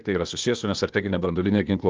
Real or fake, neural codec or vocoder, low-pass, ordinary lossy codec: fake; codec, 16 kHz, 0.8 kbps, ZipCodec; 7.2 kHz; Opus, 24 kbps